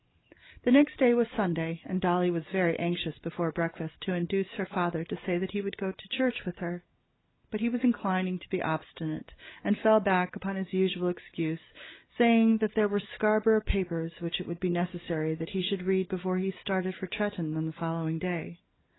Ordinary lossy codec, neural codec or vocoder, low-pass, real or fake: AAC, 16 kbps; none; 7.2 kHz; real